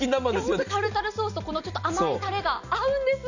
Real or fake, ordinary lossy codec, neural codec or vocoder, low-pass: real; none; none; 7.2 kHz